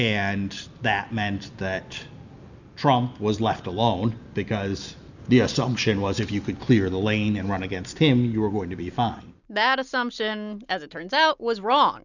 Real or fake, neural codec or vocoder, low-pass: real; none; 7.2 kHz